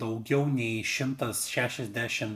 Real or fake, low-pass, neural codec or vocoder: real; 14.4 kHz; none